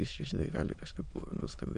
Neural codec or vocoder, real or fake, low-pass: autoencoder, 22.05 kHz, a latent of 192 numbers a frame, VITS, trained on many speakers; fake; 9.9 kHz